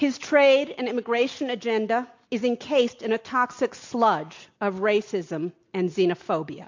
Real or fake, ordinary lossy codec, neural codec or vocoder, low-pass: real; MP3, 48 kbps; none; 7.2 kHz